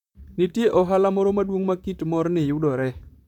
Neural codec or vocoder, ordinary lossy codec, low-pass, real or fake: vocoder, 44.1 kHz, 128 mel bands, Pupu-Vocoder; none; 19.8 kHz; fake